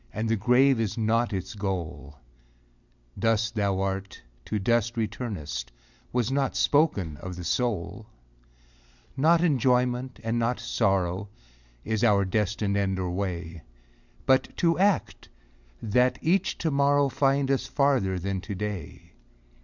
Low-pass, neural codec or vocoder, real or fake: 7.2 kHz; none; real